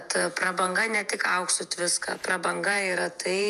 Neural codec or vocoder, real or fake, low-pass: vocoder, 48 kHz, 128 mel bands, Vocos; fake; 14.4 kHz